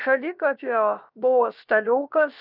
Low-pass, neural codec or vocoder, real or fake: 5.4 kHz; codec, 16 kHz, 0.7 kbps, FocalCodec; fake